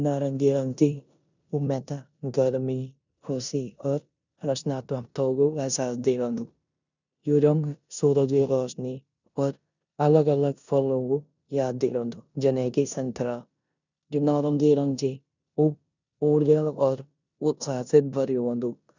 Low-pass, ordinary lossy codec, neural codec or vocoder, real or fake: 7.2 kHz; none; codec, 16 kHz in and 24 kHz out, 0.9 kbps, LongCat-Audio-Codec, four codebook decoder; fake